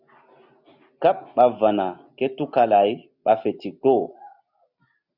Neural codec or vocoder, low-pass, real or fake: none; 5.4 kHz; real